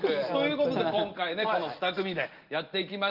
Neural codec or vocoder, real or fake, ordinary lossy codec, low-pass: none; real; Opus, 32 kbps; 5.4 kHz